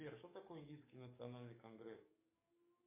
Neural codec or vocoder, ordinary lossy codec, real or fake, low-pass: codec, 44.1 kHz, 7.8 kbps, DAC; MP3, 24 kbps; fake; 3.6 kHz